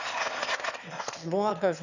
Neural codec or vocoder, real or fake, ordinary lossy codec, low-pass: autoencoder, 22.05 kHz, a latent of 192 numbers a frame, VITS, trained on one speaker; fake; none; 7.2 kHz